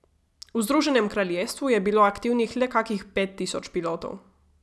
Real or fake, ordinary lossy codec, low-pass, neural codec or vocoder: real; none; none; none